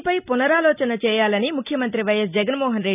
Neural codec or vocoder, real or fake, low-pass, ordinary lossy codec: none; real; 3.6 kHz; none